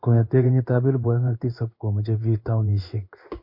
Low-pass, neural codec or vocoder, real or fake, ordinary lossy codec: 5.4 kHz; codec, 16 kHz in and 24 kHz out, 1 kbps, XY-Tokenizer; fake; MP3, 32 kbps